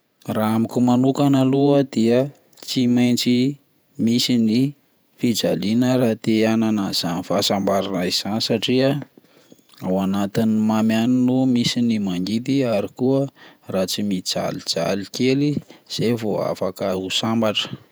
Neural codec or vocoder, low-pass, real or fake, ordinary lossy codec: vocoder, 48 kHz, 128 mel bands, Vocos; none; fake; none